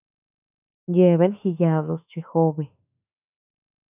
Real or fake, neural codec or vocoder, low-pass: fake; autoencoder, 48 kHz, 32 numbers a frame, DAC-VAE, trained on Japanese speech; 3.6 kHz